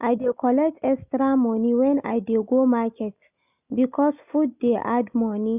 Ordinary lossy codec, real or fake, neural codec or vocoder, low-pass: none; real; none; 3.6 kHz